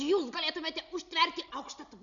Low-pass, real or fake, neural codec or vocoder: 7.2 kHz; real; none